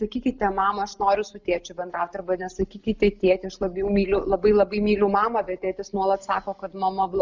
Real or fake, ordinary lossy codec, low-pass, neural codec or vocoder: real; Opus, 64 kbps; 7.2 kHz; none